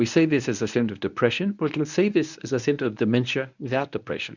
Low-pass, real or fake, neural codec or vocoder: 7.2 kHz; fake; codec, 24 kHz, 0.9 kbps, WavTokenizer, medium speech release version 1